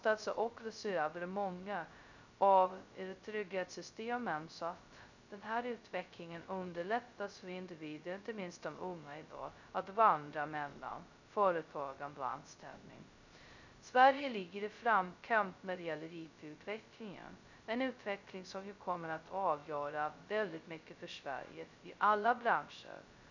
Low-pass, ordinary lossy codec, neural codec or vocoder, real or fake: 7.2 kHz; none; codec, 16 kHz, 0.2 kbps, FocalCodec; fake